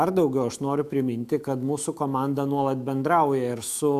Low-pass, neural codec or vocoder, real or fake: 14.4 kHz; autoencoder, 48 kHz, 128 numbers a frame, DAC-VAE, trained on Japanese speech; fake